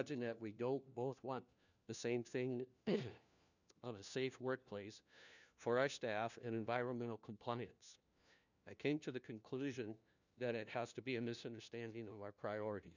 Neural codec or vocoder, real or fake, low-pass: codec, 16 kHz, 1 kbps, FunCodec, trained on LibriTTS, 50 frames a second; fake; 7.2 kHz